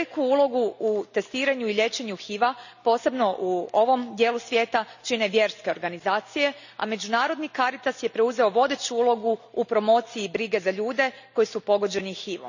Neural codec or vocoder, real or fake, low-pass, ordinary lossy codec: none; real; 7.2 kHz; none